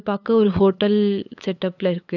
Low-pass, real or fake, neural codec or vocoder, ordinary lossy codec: 7.2 kHz; fake; codec, 16 kHz, 8 kbps, FunCodec, trained on LibriTTS, 25 frames a second; none